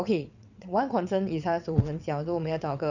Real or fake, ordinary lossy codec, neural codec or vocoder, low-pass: fake; none; vocoder, 22.05 kHz, 80 mel bands, WaveNeXt; 7.2 kHz